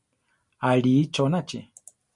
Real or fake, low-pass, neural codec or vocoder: real; 10.8 kHz; none